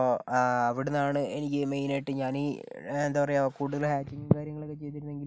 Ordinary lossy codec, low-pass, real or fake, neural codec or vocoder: none; none; real; none